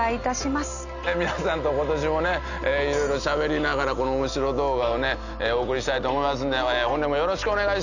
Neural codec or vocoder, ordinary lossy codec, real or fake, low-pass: none; none; real; 7.2 kHz